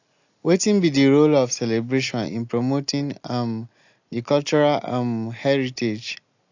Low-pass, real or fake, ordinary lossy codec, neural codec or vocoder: 7.2 kHz; real; AAC, 48 kbps; none